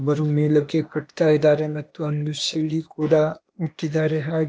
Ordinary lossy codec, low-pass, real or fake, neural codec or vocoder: none; none; fake; codec, 16 kHz, 0.8 kbps, ZipCodec